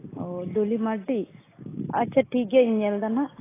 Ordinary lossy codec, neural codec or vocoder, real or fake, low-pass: AAC, 16 kbps; none; real; 3.6 kHz